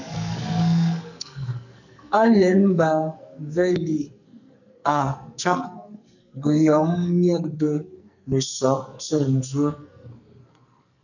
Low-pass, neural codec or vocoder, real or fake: 7.2 kHz; codec, 44.1 kHz, 2.6 kbps, SNAC; fake